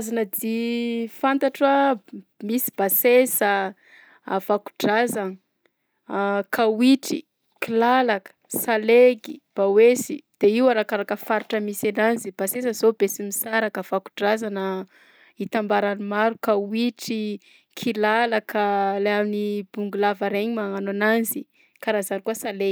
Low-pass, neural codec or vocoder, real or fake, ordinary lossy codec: none; none; real; none